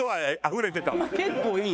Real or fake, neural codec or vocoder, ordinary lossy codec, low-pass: fake; codec, 16 kHz, 4 kbps, X-Codec, HuBERT features, trained on balanced general audio; none; none